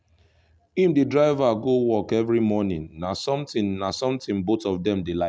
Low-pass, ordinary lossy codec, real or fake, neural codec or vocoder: none; none; real; none